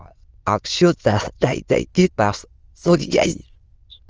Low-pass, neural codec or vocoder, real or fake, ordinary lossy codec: 7.2 kHz; autoencoder, 22.05 kHz, a latent of 192 numbers a frame, VITS, trained on many speakers; fake; Opus, 24 kbps